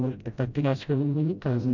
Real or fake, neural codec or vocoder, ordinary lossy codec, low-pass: fake; codec, 16 kHz, 0.5 kbps, FreqCodec, smaller model; none; 7.2 kHz